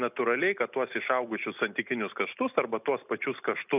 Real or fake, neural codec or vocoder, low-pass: real; none; 3.6 kHz